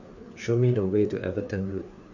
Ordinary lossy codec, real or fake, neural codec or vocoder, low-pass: none; fake; codec, 16 kHz, 4 kbps, FreqCodec, larger model; 7.2 kHz